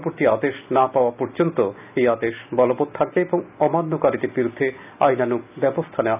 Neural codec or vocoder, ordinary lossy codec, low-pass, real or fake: none; none; 3.6 kHz; real